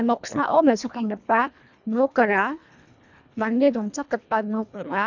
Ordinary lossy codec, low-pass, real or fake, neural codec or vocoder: none; 7.2 kHz; fake; codec, 24 kHz, 1.5 kbps, HILCodec